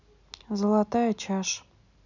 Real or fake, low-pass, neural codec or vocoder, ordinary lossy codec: real; 7.2 kHz; none; none